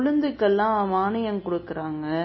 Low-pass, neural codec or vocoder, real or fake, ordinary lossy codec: 7.2 kHz; none; real; MP3, 24 kbps